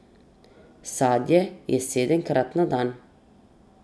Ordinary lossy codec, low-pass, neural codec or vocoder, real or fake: none; none; none; real